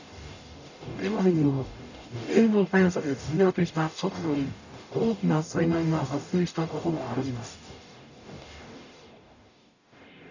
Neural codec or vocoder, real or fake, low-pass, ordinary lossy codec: codec, 44.1 kHz, 0.9 kbps, DAC; fake; 7.2 kHz; none